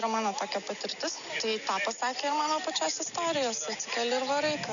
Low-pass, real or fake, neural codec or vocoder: 7.2 kHz; real; none